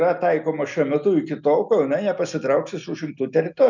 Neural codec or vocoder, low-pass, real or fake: none; 7.2 kHz; real